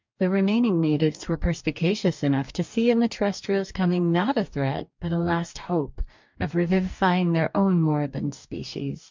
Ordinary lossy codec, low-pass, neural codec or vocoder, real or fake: MP3, 64 kbps; 7.2 kHz; codec, 44.1 kHz, 2.6 kbps, DAC; fake